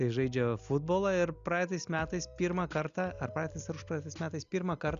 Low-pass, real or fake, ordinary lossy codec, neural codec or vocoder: 7.2 kHz; real; Opus, 64 kbps; none